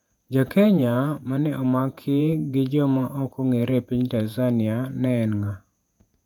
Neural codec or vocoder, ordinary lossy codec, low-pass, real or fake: vocoder, 48 kHz, 128 mel bands, Vocos; none; 19.8 kHz; fake